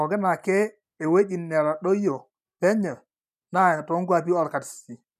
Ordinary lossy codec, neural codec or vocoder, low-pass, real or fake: none; none; 14.4 kHz; real